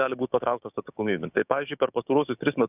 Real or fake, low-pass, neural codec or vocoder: fake; 3.6 kHz; codec, 16 kHz, 6 kbps, DAC